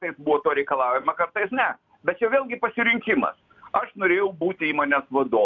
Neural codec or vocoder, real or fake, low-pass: none; real; 7.2 kHz